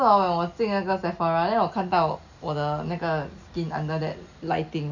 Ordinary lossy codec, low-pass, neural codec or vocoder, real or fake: none; 7.2 kHz; none; real